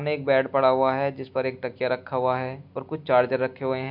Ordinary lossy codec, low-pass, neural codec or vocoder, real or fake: MP3, 48 kbps; 5.4 kHz; none; real